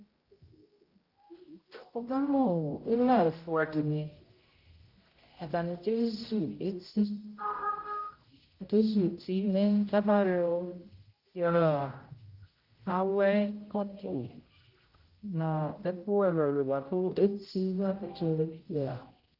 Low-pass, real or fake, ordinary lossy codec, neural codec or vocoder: 5.4 kHz; fake; Opus, 24 kbps; codec, 16 kHz, 0.5 kbps, X-Codec, HuBERT features, trained on general audio